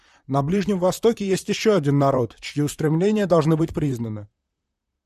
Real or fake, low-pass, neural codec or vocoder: fake; 14.4 kHz; vocoder, 44.1 kHz, 128 mel bands, Pupu-Vocoder